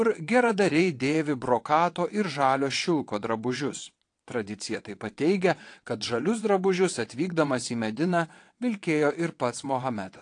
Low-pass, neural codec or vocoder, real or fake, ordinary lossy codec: 9.9 kHz; vocoder, 22.05 kHz, 80 mel bands, WaveNeXt; fake; AAC, 48 kbps